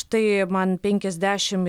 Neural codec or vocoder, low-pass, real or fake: none; 19.8 kHz; real